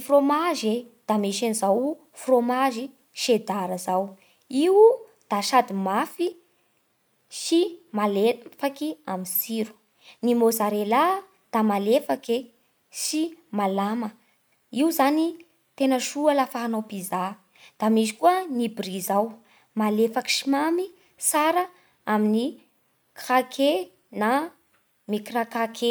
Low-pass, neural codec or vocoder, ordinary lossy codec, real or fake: none; none; none; real